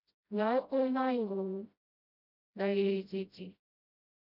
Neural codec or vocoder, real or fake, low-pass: codec, 16 kHz, 0.5 kbps, FreqCodec, smaller model; fake; 5.4 kHz